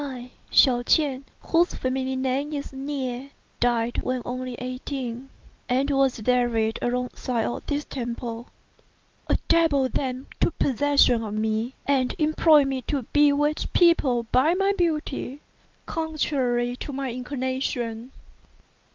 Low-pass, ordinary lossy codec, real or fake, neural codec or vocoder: 7.2 kHz; Opus, 24 kbps; real; none